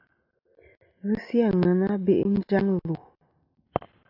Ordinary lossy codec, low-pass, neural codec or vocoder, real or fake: AAC, 48 kbps; 5.4 kHz; none; real